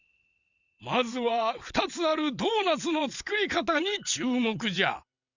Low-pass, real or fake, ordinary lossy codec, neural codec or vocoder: 7.2 kHz; fake; Opus, 64 kbps; vocoder, 22.05 kHz, 80 mel bands, WaveNeXt